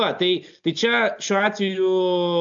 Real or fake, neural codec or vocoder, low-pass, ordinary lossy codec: real; none; 7.2 kHz; AAC, 64 kbps